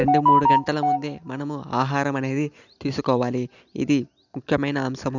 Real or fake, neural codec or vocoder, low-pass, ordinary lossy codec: real; none; 7.2 kHz; none